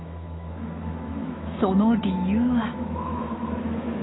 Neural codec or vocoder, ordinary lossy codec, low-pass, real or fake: autoencoder, 48 kHz, 128 numbers a frame, DAC-VAE, trained on Japanese speech; AAC, 16 kbps; 7.2 kHz; fake